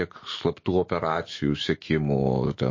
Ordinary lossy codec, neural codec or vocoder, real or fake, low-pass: MP3, 32 kbps; autoencoder, 48 kHz, 128 numbers a frame, DAC-VAE, trained on Japanese speech; fake; 7.2 kHz